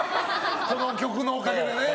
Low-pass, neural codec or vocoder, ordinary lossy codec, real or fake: none; none; none; real